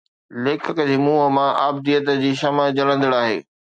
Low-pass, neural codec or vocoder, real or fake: 9.9 kHz; none; real